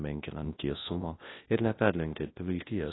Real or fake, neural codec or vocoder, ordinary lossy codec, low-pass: fake; codec, 24 kHz, 0.9 kbps, WavTokenizer, large speech release; AAC, 16 kbps; 7.2 kHz